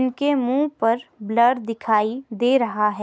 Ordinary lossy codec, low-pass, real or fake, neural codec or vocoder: none; none; real; none